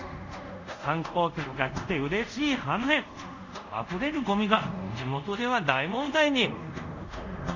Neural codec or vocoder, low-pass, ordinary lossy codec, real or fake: codec, 24 kHz, 0.5 kbps, DualCodec; 7.2 kHz; none; fake